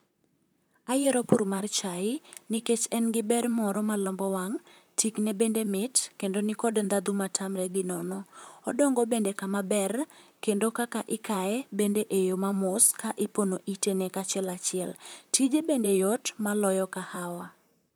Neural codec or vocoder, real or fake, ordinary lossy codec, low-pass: vocoder, 44.1 kHz, 128 mel bands, Pupu-Vocoder; fake; none; none